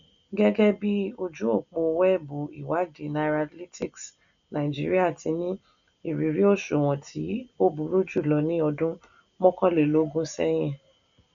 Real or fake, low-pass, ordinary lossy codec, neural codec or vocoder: real; 7.2 kHz; none; none